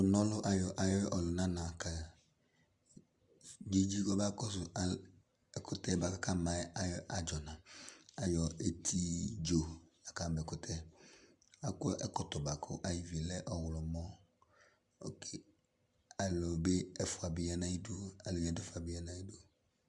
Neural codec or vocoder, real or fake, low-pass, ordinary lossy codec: none; real; 10.8 kHz; Opus, 64 kbps